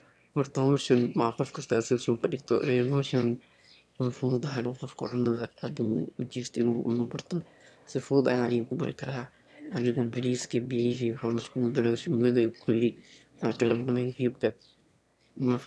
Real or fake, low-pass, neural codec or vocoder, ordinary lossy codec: fake; none; autoencoder, 22.05 kHz, a latent of 192 numbers a frame, VITS, trained on one speaker; none